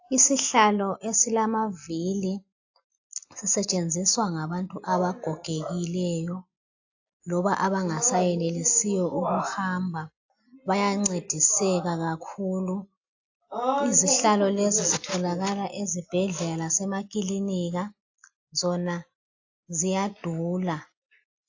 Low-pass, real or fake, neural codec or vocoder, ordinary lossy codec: 7.2 kHz; real; none; AAC, 48 kbps